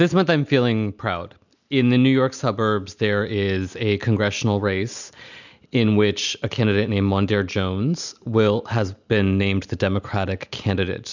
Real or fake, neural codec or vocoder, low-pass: real; none; 7.2 kHz